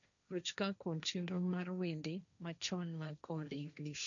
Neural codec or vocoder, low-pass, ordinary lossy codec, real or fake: codec, 16 kHz, 1.1 kbps, Voila-Tokenizer; 7.2 kHz; none; fake